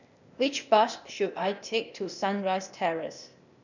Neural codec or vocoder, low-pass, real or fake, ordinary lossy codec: codec, 16 kHz, 0.8 kbps, ZipCodec; 7.2 kHz; fake; none